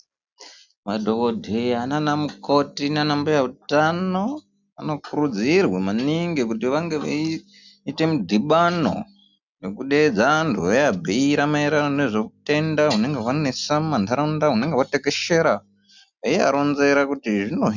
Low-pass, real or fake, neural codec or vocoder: 7.2 kHz; real; none